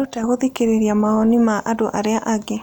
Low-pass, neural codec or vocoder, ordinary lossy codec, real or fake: 19.8 kHz; none; none; real